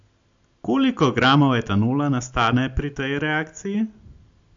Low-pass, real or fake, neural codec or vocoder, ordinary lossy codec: 7.2 kHz; real; none; AAC, 64 kbps